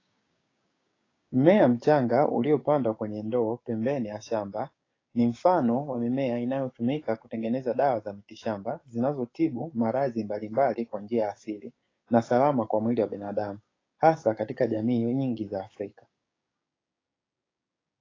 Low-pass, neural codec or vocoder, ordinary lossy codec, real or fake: 7.2 kHz; codec, 16 kHz, 6 kbps, DAC; AAC, 32 kbps; fake